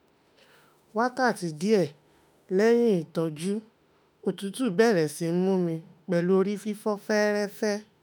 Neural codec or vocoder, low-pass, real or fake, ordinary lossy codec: autoencoder, 48 kHz, 32 numbers a frame, DAC-VAE, trained on Japanese speech; none; fake; none